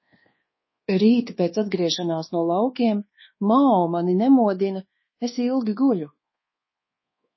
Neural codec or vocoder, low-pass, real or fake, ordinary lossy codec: codec, 24 kHz, 1.2 kbps, DualCodec; 7.2 kHz; fake; MP3, 24 kbps